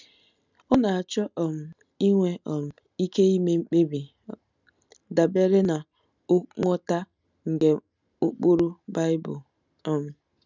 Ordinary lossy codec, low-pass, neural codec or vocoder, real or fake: none; 7.2 kHz; none; real